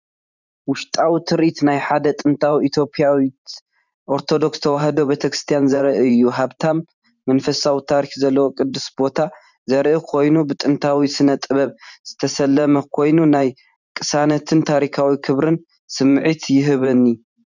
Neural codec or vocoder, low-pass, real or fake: vocoder, 44.1 kHz, 128 mel bands every 512 samples, BigVGAN v2; 7.2 kHz; fake